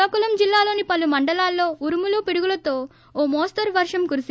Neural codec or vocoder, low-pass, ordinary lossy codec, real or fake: none; none; none; real